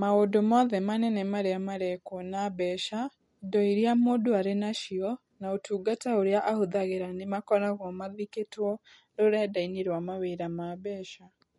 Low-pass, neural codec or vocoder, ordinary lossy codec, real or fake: 19.8 kHz; none; MP3, 48 kbps; real